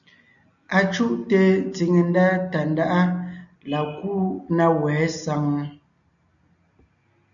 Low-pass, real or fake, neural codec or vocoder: 7.2 kHz; real; none